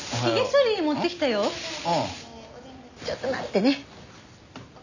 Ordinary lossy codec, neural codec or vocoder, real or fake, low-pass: none; none; real; 7.2 kHz